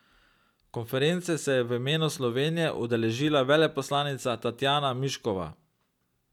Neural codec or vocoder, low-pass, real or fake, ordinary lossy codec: none; 19.8 kHz; real; none